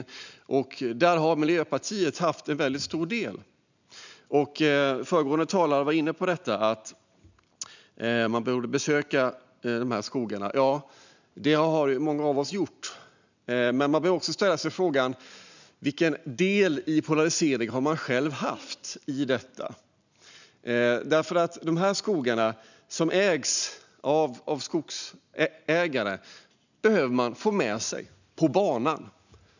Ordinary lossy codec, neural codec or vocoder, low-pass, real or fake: none; none; 7.2 kHz; real